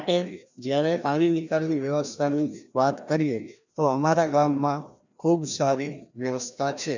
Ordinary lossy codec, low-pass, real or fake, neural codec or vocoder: none; 7.2 kHz; fake; codec, 16 kHz, 1 kbps, FreqCodec, larger model